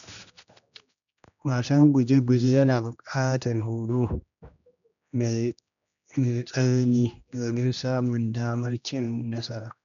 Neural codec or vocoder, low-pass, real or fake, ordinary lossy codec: codec, 16 kHz, 1 kbps, X-Codec, HuBERT features, trained on general audio; 7.2 kHz; fake; none